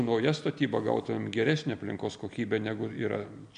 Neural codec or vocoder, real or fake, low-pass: none; real; 9.9 kHz